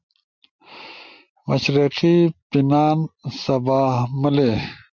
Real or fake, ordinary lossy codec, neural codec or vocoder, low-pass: real; MP3, 64 kbps; none; 7.2 kHz